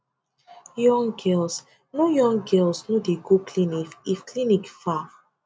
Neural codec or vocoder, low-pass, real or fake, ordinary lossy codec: none; none; real; none